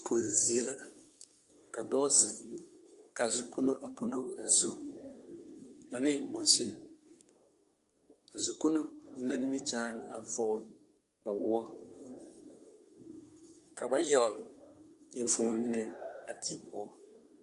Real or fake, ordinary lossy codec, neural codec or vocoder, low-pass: fake; Opus, 64 kbps; codec, 24 kHz, 1 kbps, SNAC; 10.8 kHz